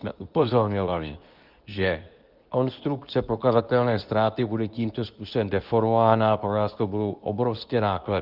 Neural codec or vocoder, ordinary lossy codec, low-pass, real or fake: codec, 24 kHz, 0.9 kbps, WavTokenizer, medium speech release version 2; Opus, 32 kbps; 5.4 kHz; fake